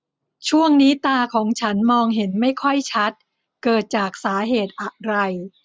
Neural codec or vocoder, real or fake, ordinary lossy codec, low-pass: none; real; none; none